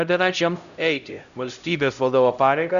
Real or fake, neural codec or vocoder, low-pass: fake; codec, 16 kHz, 0.5 kbps, X-Codec, HuBERT features, trained on LibriSpeech; 7.2 kHz